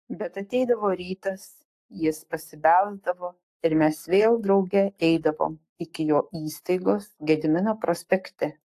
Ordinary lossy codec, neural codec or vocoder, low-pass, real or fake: AAC, 64 kbps; codec, 44.1 kHz, 7.8 kbps, Pupu-Codec; 14.4 kHz; fake